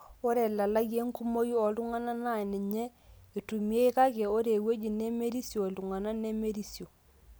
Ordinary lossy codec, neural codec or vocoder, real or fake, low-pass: none; none; real; none